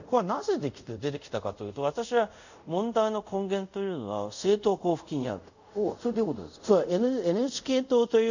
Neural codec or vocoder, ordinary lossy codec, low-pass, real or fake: codec, 24 kHz, 0.5 kbps, DualCodec; none; 7.2 kHz; fake